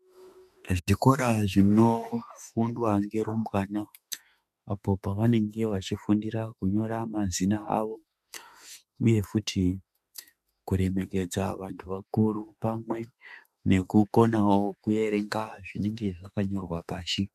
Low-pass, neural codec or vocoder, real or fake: 14.4 kHz; autoencoder, 48 kHz, 32 numbers a frame, DAC-VAE, trained on Japanese speech; fake